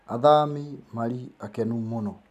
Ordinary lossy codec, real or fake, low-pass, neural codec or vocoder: none; real; 14.4 kHz; none